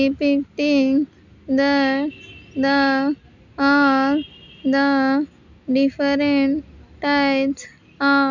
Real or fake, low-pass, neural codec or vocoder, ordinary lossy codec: real; 7.2 kHz; none; none